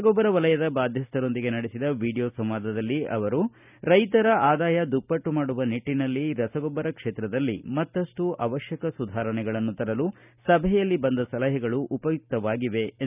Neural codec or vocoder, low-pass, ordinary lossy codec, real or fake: none; 3.6 kHz; none; real